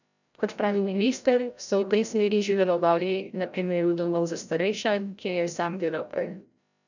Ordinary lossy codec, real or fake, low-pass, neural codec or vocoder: none; fake; 7.2 kHz; codec, 16 kHz, 0.5 kbps, FreqCodec, larger model